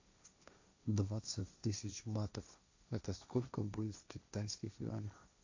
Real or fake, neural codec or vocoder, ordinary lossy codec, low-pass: fake; codec, 16 kHz, 1.1 kbps, Voila-Tokenizer; AAC, 48 kbps; 7.2 kHz